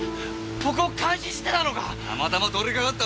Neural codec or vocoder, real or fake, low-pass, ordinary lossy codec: none; real; none; none